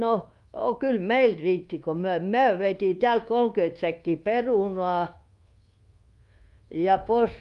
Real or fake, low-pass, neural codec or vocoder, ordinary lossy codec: fake; 10.8 kHz; codec, 24 kHz, 1.2 kbps, DualCodec; Opus, 32 kbps